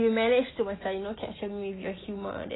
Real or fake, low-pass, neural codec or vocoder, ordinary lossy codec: real; 7.2 kHz; none; AAC, 16 kbps